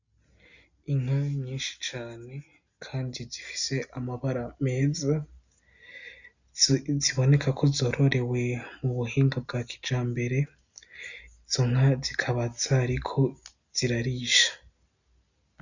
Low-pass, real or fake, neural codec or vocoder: 7.2 kHz; real; none